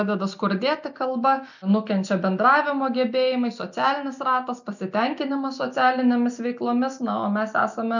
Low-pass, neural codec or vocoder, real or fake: 7.2 kHz; none; real